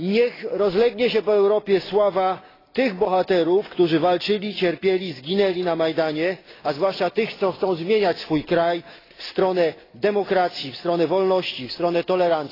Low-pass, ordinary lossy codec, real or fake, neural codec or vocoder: 5.4 kHz; AAC, 24 kbps; real; none